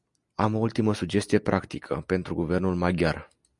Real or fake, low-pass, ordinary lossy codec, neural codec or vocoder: real; 10.8 kHz; Opus, 64 kbps; none